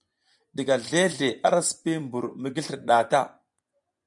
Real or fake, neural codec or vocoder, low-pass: fake; vocoder, 24 kHz, 100 mel bands, Vocos; 10.8 kHz